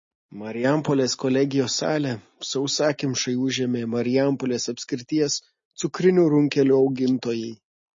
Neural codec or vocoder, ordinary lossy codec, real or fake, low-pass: none; MP3, 32 kbps; real; 7.2 kHz